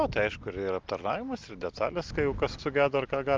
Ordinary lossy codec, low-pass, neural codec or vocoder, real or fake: Opus, 32 kbps; 7.2 kHz; none; real